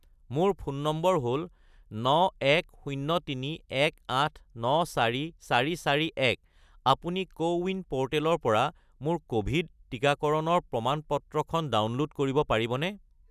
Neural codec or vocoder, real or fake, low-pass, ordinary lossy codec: none; real; 14.4 kHz; none